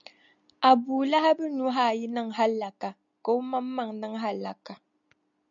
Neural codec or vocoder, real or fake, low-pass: none; real; 7.2 kHz